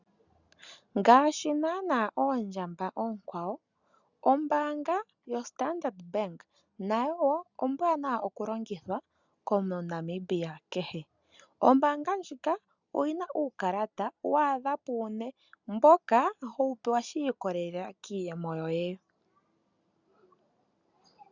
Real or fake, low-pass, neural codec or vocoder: real; 7.2 kHz; none